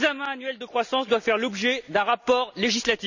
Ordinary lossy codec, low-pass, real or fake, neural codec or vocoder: none; 7.2 kHz; real; none